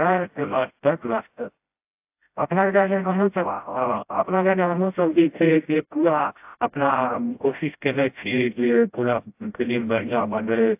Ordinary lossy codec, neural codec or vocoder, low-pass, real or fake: AAC, 32 kbps; codec, 16 kHz, 0.5 kbps, FreqCodec, smaller model; 3.6 kHz; fake